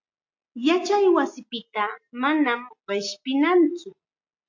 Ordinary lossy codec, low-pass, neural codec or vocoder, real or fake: MP3, 48 kbps; 7.2 kHz; none; real